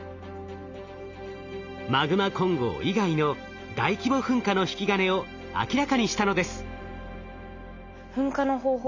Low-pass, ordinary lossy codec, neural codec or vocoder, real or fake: 7.2 kHz; none; none; real